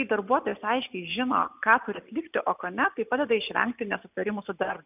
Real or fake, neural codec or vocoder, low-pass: real; none; 3.6 kHz